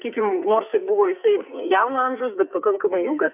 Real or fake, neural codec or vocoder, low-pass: fake; codec, 44.1 kHz, 2.6 kbps, SNAC; 3.6 kHz